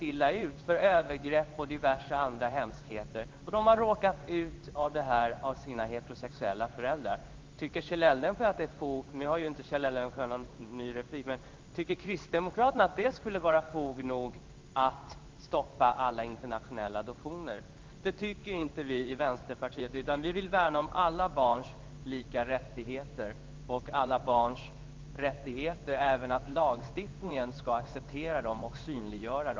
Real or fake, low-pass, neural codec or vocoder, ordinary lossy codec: fake; 7.2 kHz; codec, 16 kHz in and 24 kHz out, 1 kbps, XY-Tokenizer; Opus, 32 kbps